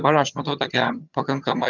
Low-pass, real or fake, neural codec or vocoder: 7.2 kHz; fake; vocoder, 22.05 kHz, 80 mel bands, HiFi-GAN